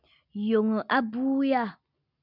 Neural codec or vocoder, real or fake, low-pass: none; real; 5.4 kHz